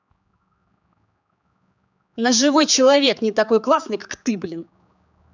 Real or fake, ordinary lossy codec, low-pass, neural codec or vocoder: fake; none; 7.2 kHz; codec, 16 kHz, 4 kbps, X-Codec, HuBERT features, trained on general audio